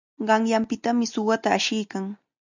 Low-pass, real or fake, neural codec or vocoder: 7.2 kHz; real; none